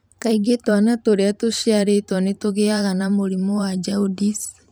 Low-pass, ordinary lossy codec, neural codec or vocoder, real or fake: none; none; vocoder, 44.1 kHz, 128 mel bands every 512 samples, BigVGAN v2; fake